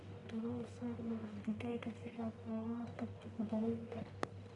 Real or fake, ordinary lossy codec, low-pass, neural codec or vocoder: fake; none; 10.8 kHz; codec, 44.1 kHz, 1.7 kbps, Pupu-Codec